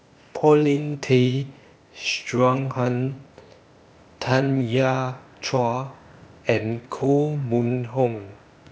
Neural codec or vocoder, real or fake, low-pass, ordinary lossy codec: codec, 16 kHz, 0.8 kbps, ZipCodec; fake; none; none